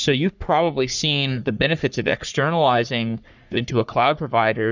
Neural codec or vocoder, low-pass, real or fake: codec, 44.1 kHz, 3.4 kbps, Pupu-Codec; 7.2 kHz; fake